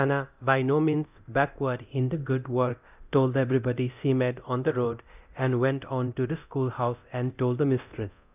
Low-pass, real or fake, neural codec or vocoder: 3.6 kHz; fake; codec, 24 kHz, 0.9 kbps, DualCodec